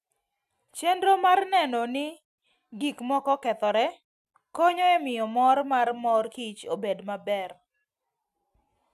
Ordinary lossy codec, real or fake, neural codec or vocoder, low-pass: none; real; none; 14.4 kHz